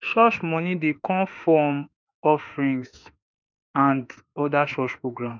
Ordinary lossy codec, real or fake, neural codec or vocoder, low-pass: none; fake; autoencoder, 48 kHz, 32 numbers a frame, DAC-VAE, trained on Japanese speech; 7.2 kHz